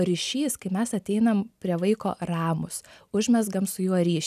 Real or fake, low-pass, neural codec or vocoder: real; 14.4 kHz; none